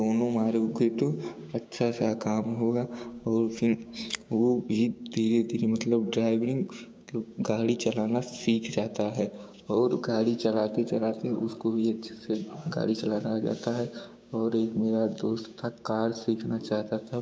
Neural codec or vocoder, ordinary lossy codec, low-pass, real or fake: codec, 16 kHz, 6 kbps, DAC; none; none; fake